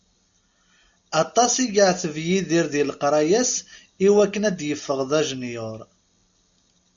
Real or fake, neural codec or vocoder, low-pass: real; none; 7.2 kHz